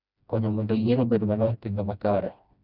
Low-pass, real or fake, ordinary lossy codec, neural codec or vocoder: 5.4 kHz; fake; none; codec, 16 kHz, 1 kbps, FreqCodec, smaller model